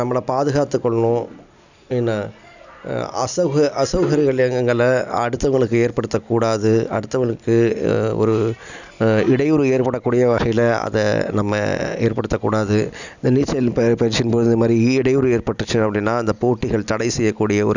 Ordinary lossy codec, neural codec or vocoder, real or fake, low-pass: none; none; real; 7.2 kHz